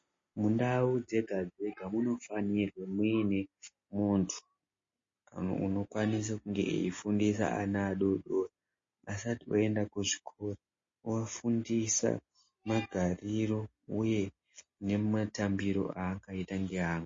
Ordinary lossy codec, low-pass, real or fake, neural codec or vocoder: MP3, 32 kbps; 7.2 kHz; real; none